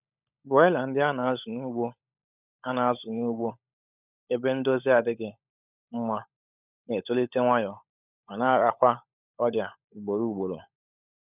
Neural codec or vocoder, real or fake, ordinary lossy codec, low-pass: codec, 16 kHz, 16 kbps, FunCodec, trained on LibriTTS, 50 frames a second; fake; none; 3.6 kHz